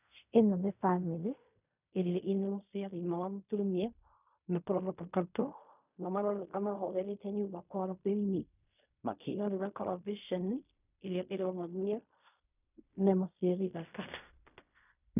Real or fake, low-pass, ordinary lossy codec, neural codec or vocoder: fake; 3.6 kHz; none; codec, 16 kHz in and 24 kHz out, 0.4 kbps, LongCat-Audio-Codec, fine tuned four codebook decoder